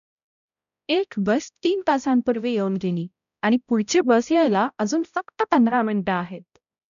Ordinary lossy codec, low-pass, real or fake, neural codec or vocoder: none; 7.2 kHz; fake; codec, 16 kHz, 0.5 kbps, X-Codec, HuBERT features, trained on balanced general audio